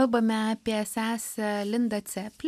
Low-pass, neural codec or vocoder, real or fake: 14.4 kHz; none; real